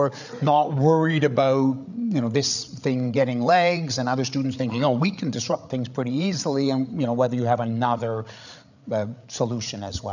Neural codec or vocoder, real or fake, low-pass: codec, 16 kHz, 8 kbps, FreqCodec, larger model; fake; 7.2 kHz